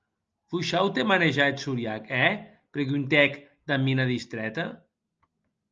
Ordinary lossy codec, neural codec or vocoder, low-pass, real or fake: Opus, 24 kbps; none; 7.2 kHz; real